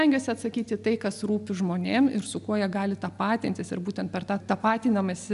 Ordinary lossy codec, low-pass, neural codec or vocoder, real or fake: AAC, 96 kbps; 10.8 kHz; none; real